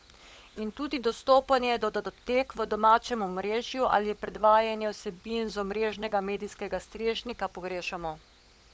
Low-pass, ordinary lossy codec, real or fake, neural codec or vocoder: none; none; fake; codec, 16 kHz, 16 kbps, FunCodec, trained on LibriTTS, 50 frames a second